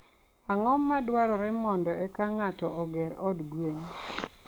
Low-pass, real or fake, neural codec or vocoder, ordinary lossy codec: none; fake; codec, 44.1 kHz, 7.8 kbps, DAC; none